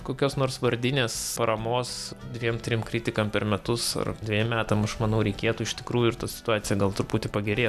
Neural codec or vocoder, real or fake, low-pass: none; real; 14.4 kHz